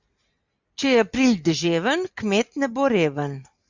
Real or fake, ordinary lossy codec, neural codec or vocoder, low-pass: real; Opus, 64 kbps; none; 7.2 kHz